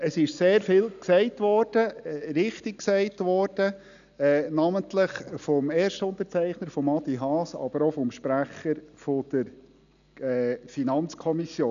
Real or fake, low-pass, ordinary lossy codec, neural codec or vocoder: real; 7.2 kHz; none; none